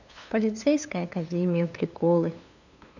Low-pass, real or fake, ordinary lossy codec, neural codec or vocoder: 7.2 kHz; fake; none; codec, 16 kHz, 2 kbps, FunCodec, trained on LibriTTS, 25 frames a second